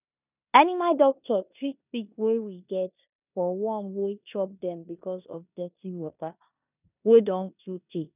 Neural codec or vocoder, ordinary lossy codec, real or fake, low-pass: codec, 16 kHz in and 24 kHz out, 0.9 kbps, LongCat-Audio-Codec, four codebook decoder; none; fake; 3.6 kHz